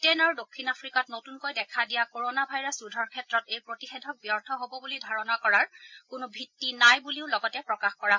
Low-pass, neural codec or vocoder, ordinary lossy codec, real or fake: 7.2 kHz; none; MP3, 48 kbps; real